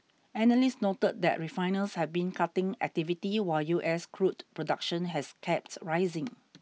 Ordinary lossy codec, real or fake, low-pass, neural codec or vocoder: none; real; none; none